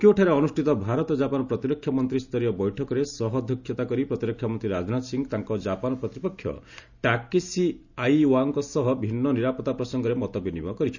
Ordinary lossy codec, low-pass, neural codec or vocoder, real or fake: none; 7.2 kHz; none; real